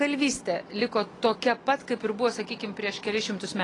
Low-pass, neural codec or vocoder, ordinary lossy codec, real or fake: 10.8 kHz; none; AAC, 32 kbps; real